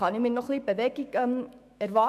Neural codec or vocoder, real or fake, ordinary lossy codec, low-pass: autoencoder, 48 kHz, 128 numbers a frame, DAC-VAE, trained on Japanese speech; fake; none; 14.4 kHz